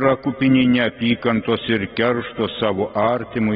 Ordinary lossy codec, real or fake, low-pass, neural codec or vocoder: AAC, 16 kbps; real; 7.2 kHz; none